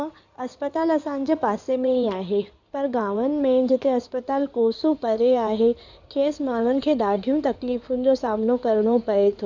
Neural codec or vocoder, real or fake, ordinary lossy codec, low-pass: codec, 16 kHz in and 24 kHz out, 2.2 kbps, FireRedTTS-2 codec; fake; none; 7.2 kHz